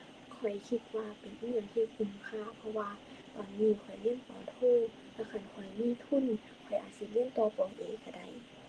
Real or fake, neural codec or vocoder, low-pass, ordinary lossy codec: real; none; 10.8 kHz; Opus, 16 kbps